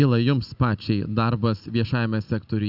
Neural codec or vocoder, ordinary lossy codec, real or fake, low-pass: none; Opus, 64 kbps; real; 5.4 kHz